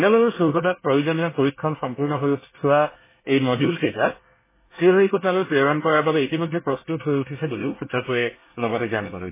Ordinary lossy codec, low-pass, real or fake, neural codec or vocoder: MP3, 16 kbps; 3.6 kHz; fake; codec, 24 kHz, 1 kbps, SNAC